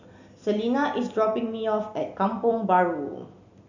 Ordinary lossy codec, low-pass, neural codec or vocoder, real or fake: none; 7.2 kHz; none; real